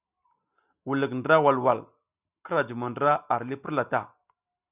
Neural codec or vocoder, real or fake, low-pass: none; real; 3.6 kHz